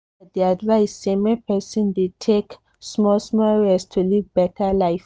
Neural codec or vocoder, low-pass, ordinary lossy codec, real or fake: none; none; none; real